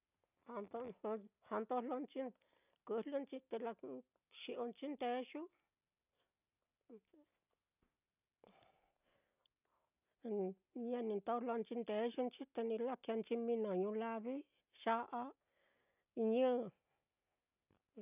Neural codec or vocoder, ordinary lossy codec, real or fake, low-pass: none; none; real; 3.6 kHz